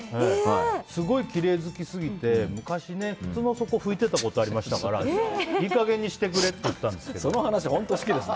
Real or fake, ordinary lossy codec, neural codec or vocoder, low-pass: real; none; none; none